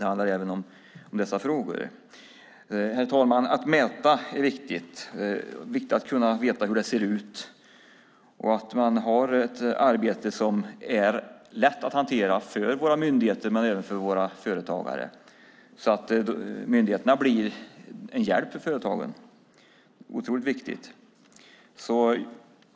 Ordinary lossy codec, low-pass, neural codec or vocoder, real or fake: none; none; none; real